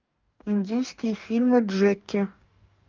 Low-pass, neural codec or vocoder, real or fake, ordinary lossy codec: 7.2 kHz; codec, 44.1 kHz, 2.6 kbps, DAC; fake; Opus, 24 kbps